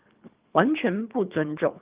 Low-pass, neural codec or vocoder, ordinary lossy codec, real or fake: 3.6 kHz; codec, 24 kHz, 3 kbps, HILCodec; Opus, 24 kbps; fake